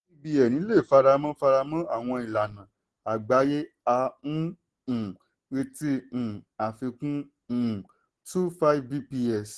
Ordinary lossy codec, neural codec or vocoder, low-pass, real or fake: Opus, 16 kbps; none; 10.8 kHz; real